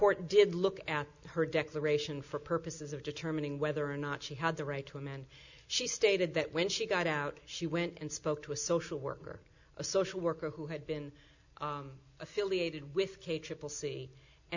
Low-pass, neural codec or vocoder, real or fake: 7.2 kHz; none; real